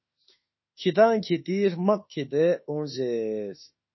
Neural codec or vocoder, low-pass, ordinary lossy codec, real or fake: autoencoder, 48 kHz, 32 numbers a frame, DAC-VAE, trained on Japanese speech; 7.2 kHz; MP3, 24 kbps; fake